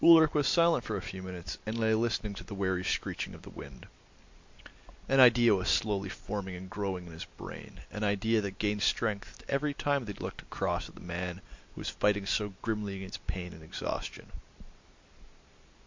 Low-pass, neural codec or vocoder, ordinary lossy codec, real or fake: 7.2 kHz; none; MP3, 48 kbps; real